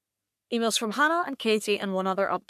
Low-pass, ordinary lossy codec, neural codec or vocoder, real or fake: 14.4 kHz; MP3, 96 kbps; codec, 44.1 kHz, 3.4 kbps, Pupu-Codec; fake